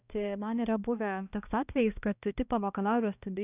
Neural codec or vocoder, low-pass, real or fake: codec, 16 kHz, 1 kbps, X-Codec, HuBERT features, trained on balanced general audio; 3.6 kHz; fake